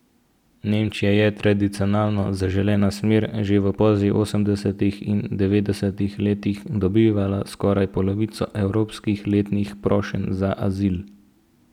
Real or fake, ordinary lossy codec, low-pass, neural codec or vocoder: real; none; 19.8 kHz; none